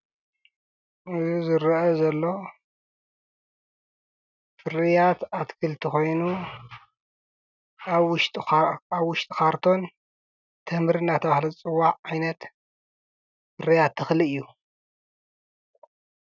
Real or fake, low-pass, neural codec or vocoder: real; 7.2 kHz; none